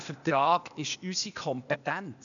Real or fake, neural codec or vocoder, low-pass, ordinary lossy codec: fake; codec, 16 kHz, 0.8 kbps, ZipCodec; 7.2 kHz; none